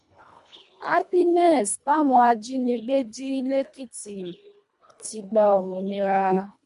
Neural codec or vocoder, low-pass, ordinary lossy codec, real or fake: codec, 24 kHz, 1.5 kbps, HILCodec; 10.8 kHz; MP3, 64 kbps; fake